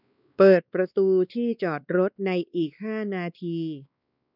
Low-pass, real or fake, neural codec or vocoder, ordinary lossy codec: 5.4 kHz; fake; codec, 16 kHz, 2 kbps, X-Codec, WavLM features, trained on Multilingual LibriSpeech; none